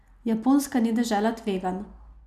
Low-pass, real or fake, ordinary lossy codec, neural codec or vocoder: 14.4 kHz; real; none; none